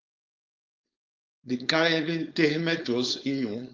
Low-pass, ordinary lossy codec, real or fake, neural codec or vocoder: 7.2 kHz; Opus, 32 kbps; fake; codec, 16 kHz, 4.8 kbps, FACodec